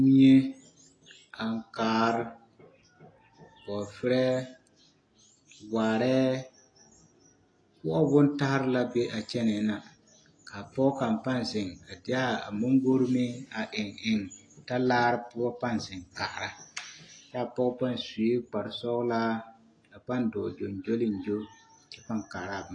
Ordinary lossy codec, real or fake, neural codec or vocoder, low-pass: AAC, 32 kbps; real; none; 9.9 kHz